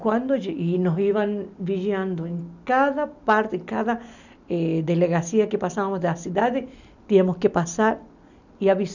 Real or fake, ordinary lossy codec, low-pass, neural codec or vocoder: real; none; 7.2 kHz; none